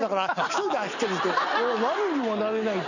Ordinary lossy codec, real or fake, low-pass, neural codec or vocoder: none; real; 7.2 kHz; none